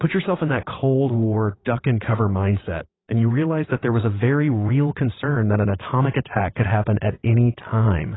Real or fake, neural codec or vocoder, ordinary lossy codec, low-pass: fake; vocoder, 22.05 kHz, 80 mel bands, WaveNeXt; AAC, 16 kbps; 7.2 kHz